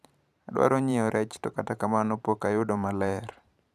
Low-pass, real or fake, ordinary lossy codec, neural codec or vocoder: 14.4 kHz; fake; none; vocoder, 44.1 kHz, 128 mel bands every 512 samples, BigVGAN v2